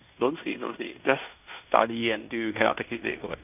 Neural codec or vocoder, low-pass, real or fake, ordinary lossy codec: codec, 16 kHz in and 24 kHz out, 0.9 kbps, LongCat-Audio-Codec, fine tuned four codebook decoder; 3.6 kHz; fake; none